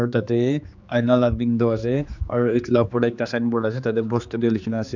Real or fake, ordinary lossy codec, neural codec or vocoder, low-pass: fake; none; codec, 16 kHz, 2 kbps, X-Codec, HuBERT features, trained on general audio; 7.2 kHz